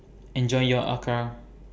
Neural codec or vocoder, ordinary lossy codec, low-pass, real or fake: none; none; none; real